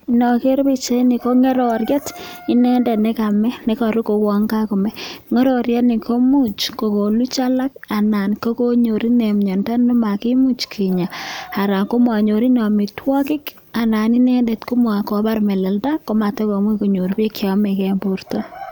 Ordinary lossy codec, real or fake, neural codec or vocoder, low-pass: none; real; none; 19.8 kHz